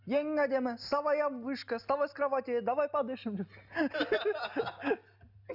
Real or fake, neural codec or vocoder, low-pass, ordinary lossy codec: real; none; 5.4 kHz; none